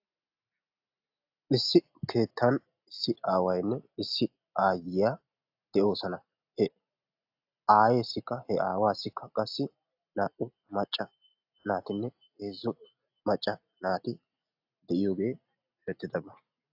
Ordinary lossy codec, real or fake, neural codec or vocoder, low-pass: AAC, 48 kbps; real; none; 5.4 kHz